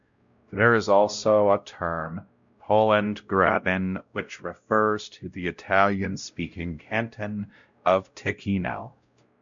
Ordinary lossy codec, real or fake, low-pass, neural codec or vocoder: MP3, 64 kbps; fake; 7.2 kHz; codec, 16 kHz, 0.5 kbps, X-Codec, WavLM features, trained on Multilingual LibriSpeech